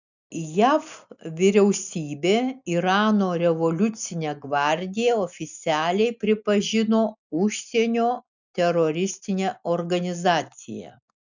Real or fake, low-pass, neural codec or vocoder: real; 7.2 kHz; none